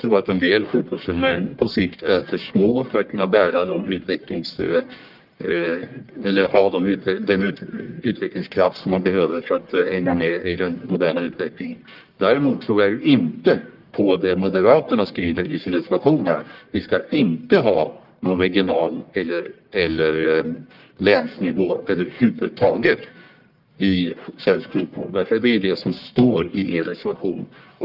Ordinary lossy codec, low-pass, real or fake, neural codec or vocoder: Opus, 24 kbps; 5.4 kHz; fake; codec, 44.1 kHz, 1.7 kbps, Pupu-Codec